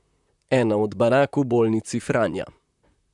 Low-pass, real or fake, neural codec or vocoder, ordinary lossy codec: 10.8 kHz; fake; vocoder, 44.1 kHz, 128 mel bands, Pupu-Vocoder; none